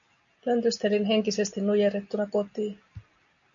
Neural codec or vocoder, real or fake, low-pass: none; real; 7.2 kHz